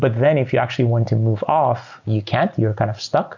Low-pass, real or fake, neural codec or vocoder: 7.2 kHz; real; none